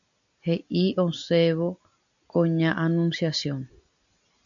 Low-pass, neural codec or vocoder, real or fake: 7.2 kHz; none; real